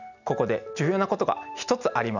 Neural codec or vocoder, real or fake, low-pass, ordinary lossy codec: none; real; 7.2 kHz; none